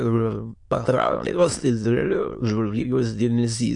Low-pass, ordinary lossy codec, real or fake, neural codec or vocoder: 9.9 kHz; MP3, 64 kbps; fake; autoencoder, 22.05 kHz, a latent of 192 numbers a frame, VITS, trained on many speakers